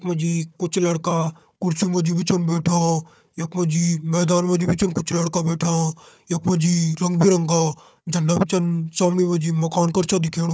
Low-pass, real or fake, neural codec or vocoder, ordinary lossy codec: none; fake; codec, 16 kHz, 4 kbps, FunCodec, trained on Chinese and English, 50 frames a second; none